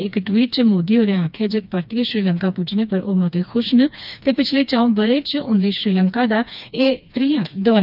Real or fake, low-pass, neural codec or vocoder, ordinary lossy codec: fake; 5.4 kHz; codec, 16 kHz, 2 kbps, FreqCodec, smaller model; none